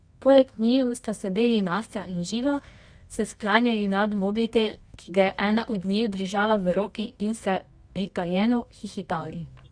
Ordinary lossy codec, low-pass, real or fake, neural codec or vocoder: Opus, 64 kbps; 9.9 kHz; fake; codec, 24 kHz, 0.9 kbps, WavTokenizer, medium music audio release